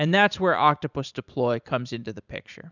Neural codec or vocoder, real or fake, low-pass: vocoder, 44.1 kHz, 128 mel bands every 512 samples, BigVGAN v2; fake; 7.2 kHz